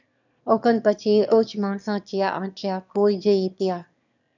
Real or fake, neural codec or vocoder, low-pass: fake; autoencoder, 22.05 kHz, a latent of 192 numbers a frame, VITS, trained on one speaker; 7.2 kHz